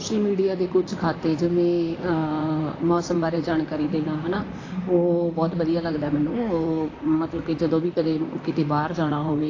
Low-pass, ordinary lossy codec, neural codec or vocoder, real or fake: 7.2 kHz; AAC, 32 kbps; vocoder, 44.1 kHz, 128 mel bands, Pupu-Vocoder; fake